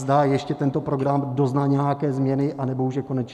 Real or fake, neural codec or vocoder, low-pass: real; none; 14.4 kHz